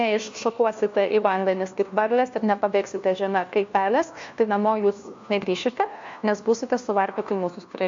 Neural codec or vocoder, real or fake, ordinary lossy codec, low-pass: codec, 16 kHz, 1 kbps, FunCodec, trained on LibriTTS, 50 frames a second; fake; MP3, 48 kbps; 7.2 kHz